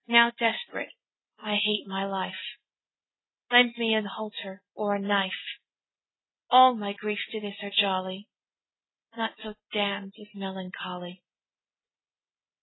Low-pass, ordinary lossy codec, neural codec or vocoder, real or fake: 7.2 kHz; AAC, 16 kbps; none; real